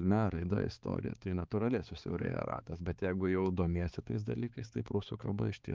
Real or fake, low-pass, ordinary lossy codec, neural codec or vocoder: fake; 7.2 kHz; Opus, 24 kbps; codec, 16 kHz, 4 kbps, X-Codec, HuBERT features, trained on balanced general audio